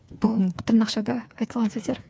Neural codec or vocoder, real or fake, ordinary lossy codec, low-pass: codec, 16 kHz, 4 kbps, FreqCodec, smaller model; fake; none; none